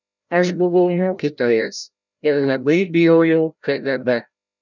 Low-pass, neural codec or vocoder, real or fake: 7.2 kHz; codec, 16 kHz, 0.5 kbps, FreqCodec, larger model; fake